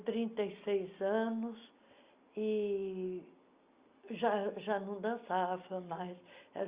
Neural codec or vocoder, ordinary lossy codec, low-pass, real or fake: none; Opus, 64 kbps; 3.6 kHz; real